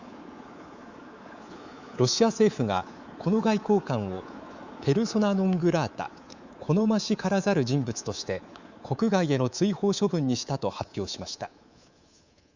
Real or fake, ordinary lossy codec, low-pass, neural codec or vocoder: fake; Opus, 64 kbps; 7.2 kHz; codec, 24 kHz, 3.1 kbps, DualCodec